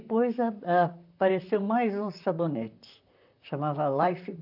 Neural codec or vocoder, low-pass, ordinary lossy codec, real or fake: vocoder, 44.1 kHz, 128 mel bands, Pupu-Vocoder; 5.4 kHz; AAC, 48 kbps; fake